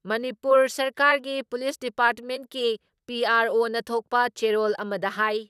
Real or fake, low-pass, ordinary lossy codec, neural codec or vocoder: fake; 14.4 kHz; none; vocoder, 44.1 kHz, 128 mel bands, Pupu-Vocoder